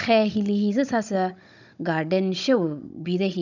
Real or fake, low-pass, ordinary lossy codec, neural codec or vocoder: fake; 7.2 kHz; none; codec, 16 kHz, 16 kbps, FunCodec, trained on Chinese and English, 50 frames a second